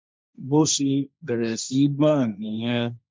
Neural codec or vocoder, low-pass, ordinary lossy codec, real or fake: codec, 16 kHz, 1.1 kbps, Voila-Tokenizer; none; none; fake